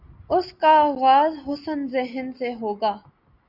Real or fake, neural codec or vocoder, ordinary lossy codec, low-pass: real; none; Opus, 64 kbps; 5.4 kHz